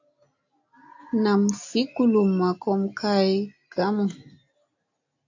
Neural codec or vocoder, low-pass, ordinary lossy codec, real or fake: none; 7.2 kHz; AAC, 48 kbps; real